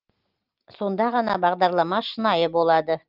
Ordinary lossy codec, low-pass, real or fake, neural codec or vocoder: Opus, 32 kbps; 5.4 kHz; real; none